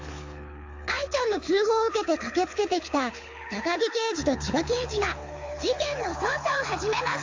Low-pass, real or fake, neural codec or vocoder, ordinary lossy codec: 7.2 kHz; fake; codec, 24 kHz, 6 kbps, HILCodec; AAC, 48 kbps